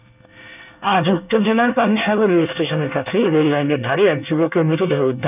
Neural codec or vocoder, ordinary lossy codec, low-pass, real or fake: codec, 24 kHz, 1 kbps, SNAC; none; 3.6 kHz; fake